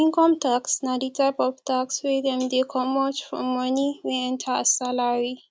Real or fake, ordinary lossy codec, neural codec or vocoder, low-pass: real; none; none; none